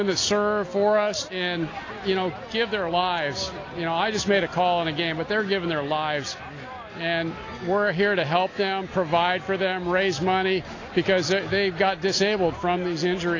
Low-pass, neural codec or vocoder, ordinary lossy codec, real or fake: 7.2 kHz; none; AAC, 32 kbps; real